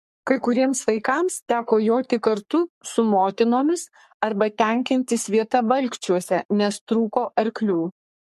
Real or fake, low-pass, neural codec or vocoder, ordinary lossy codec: fake; 14.4 kHz; codec, 44.1 kHz, 3.4 kbps, Pupu-Codec; MP3, 64 kbps